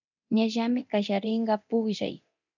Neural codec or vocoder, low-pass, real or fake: codec, 24 kHz, 0.9 kbps, DualCodec; 7.2 kHz; fake